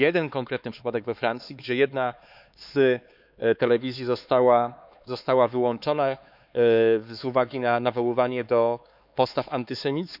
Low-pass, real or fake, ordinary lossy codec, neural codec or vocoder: 5.4 kHz; fake; none; codec, 16 kHz, 4 kbps, X-Codec, HuBERT features, trained on LibriSpeech